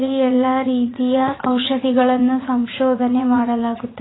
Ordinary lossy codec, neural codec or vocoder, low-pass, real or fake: AAC, 16 kbps; vocoder, 44.1 kHz, 80 mel bands, Vocos; 7.2 kHz; fake